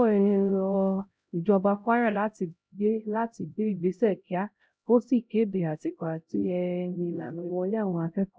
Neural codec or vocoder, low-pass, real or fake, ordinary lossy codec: codec, 16 kHz, 0.5 kbps, X-Codec, HuBERT features, trained on LibriSpeech; none; fake; none